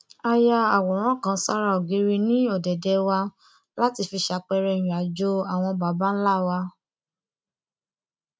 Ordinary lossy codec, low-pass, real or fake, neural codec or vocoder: none; none; real; none